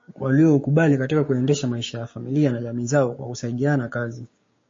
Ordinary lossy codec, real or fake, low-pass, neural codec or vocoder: MP3, 32 kbps; fake; 7.2 kHz; codec, 16 kHz, 6 kbps, DAC